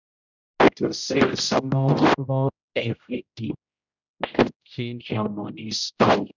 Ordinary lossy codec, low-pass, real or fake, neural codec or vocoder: none; 7.2 kHz; fake; codec, 16 kHz, 0.5 kbps, X-Codec, HuBERT features, trained on general audio